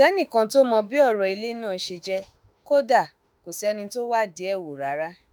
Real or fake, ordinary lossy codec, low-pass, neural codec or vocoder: fake; none; none; autoencoder, 48 kHz, 32 numbers a frame, DAC-VAE, trained on Japanese speech